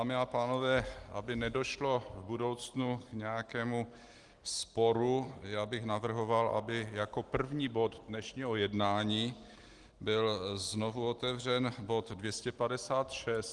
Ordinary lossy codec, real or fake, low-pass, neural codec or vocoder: Opus, 24 kbps; real; 10.8 kHz; none